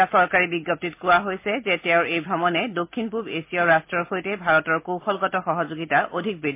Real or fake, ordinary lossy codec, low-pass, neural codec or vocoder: real; MP3, 24 kbps; 3.6 kHz; none